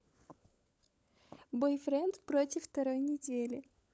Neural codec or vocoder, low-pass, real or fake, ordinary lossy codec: codec, 16 kHz, 16 kbps, FunCodec, trained on LibriTTS, 50 frames a second; none; fake; none